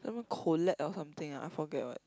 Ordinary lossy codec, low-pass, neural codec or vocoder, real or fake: none; none; none; real